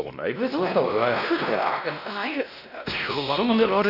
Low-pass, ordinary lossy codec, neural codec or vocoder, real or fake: 5.4 kHz; none; codec, 16 kHz, 1 kbps, X-Codec, WavLM features, trained on Multilingual LibriSpeech; fake